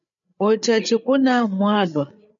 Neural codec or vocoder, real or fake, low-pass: codec, 16 kHz, 8 kbps, FreqCodec, larger model; fake; 7.2 kHz